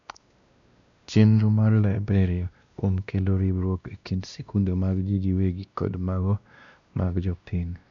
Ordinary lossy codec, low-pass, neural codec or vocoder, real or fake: none; 7.2 kHz; codec, 16 kHz, 1 kbps, X-Codec, WavLM features, trained on Multilingual LibriSpeech; fake